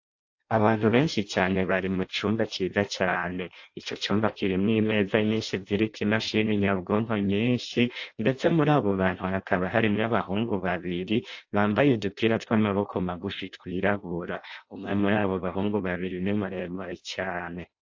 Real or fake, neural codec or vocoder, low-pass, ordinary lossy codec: fake; codec, 16 kHz in and 24 kHz out, 0.6 kbps, FireRedTTS-2 codec; 7.2 kHz; AAC, 48 kbps